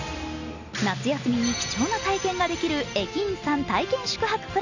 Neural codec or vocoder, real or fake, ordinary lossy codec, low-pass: none; real; none; 7.2 kHz